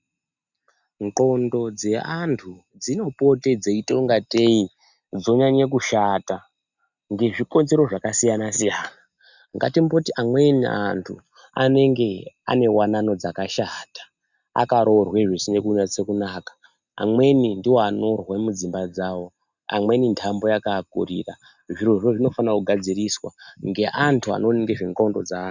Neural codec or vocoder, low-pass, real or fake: none; 7.2 kHz; real